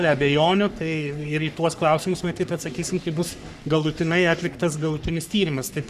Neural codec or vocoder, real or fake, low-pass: codec, 44.1 kHz, 3.4 kbps, Pupu-Codec; fake; 14.4 kHz